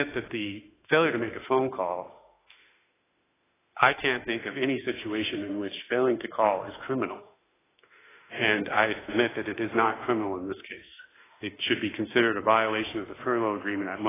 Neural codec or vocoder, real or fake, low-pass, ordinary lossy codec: autoencoder, 48 kHz, 32 numbers a frame, DAC-VAE, trained on Japanese speech; fake; 3.6 kHz; AAC, 16 kbps